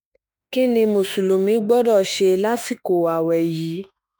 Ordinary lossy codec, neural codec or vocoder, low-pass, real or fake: none; autoencoder, 48 kHz, 32 numbers a frame, DAC-VAE, trained on Japanese speech; none; fake